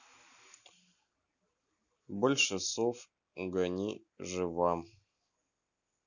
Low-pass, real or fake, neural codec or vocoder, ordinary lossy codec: 7.2 kHz; real; none; none